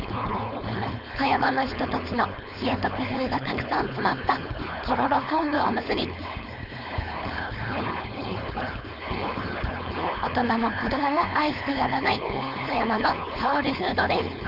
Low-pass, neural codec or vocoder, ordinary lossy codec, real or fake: 5.4 kHz; codec, 16 kHz, 4.8 kbps, FACodec; none; fake